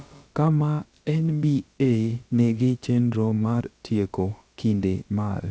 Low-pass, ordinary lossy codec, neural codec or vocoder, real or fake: none; none; codec, 16 kHz, about 1 kbps, DyCAST, with the encoder's durations; fake